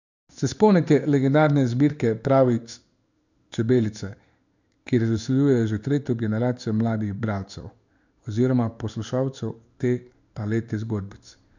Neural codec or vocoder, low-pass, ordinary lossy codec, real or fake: codec, 16 kHz in and 24 kHz out, 1 kbps, XY-Tokenizer; 7.2 kHz; none; fake